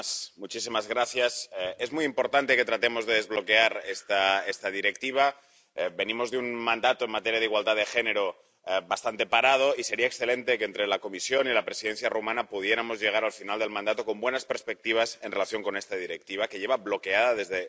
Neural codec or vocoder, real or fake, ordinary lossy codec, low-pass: none; real; none; none